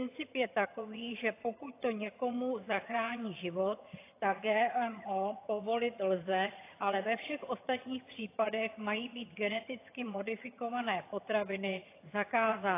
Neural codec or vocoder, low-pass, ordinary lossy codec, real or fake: vocoder, 22.05 kHz, 80 mel bands, HiFi-GAN; 3.6 kHz; AAC, 24 kbps; fake